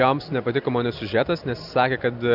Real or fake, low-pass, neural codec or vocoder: real; 5.4 kHz; none